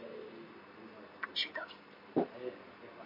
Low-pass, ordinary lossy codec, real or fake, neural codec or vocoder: 5.4 kHz; MP3, 32 kbps; real; none